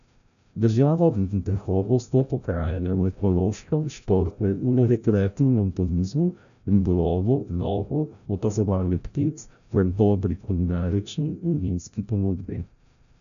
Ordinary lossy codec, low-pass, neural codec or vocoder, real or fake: none; 7.2 kHz; codec, 16 kHz, 0.5 kbps, FreqCodec, larger model; fake